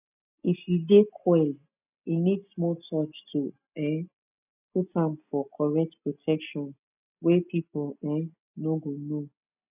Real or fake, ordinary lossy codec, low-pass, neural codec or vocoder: real; none; 3.6 kHz; none